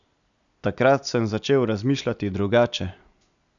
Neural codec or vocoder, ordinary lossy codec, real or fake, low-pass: none; none; real; 7.2 kHz